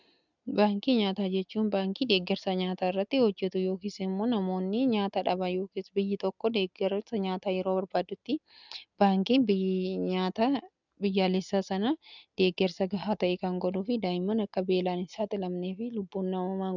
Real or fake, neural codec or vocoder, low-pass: real; none; 7.2 kHz